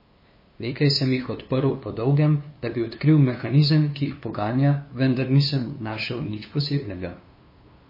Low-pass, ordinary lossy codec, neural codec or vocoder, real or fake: 5.4 kHz; MP3, 24 kbps; codec, 16 kHz, 2 kbps, FunCodec, trained on LibriTTS, 25 frames a second; fake